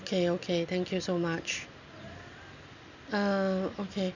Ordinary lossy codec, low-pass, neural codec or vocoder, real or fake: none; 7.2 kHz; none; real